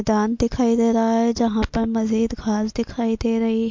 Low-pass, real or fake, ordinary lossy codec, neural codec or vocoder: 7.2 kHz; real; MP3, 48 kbps; none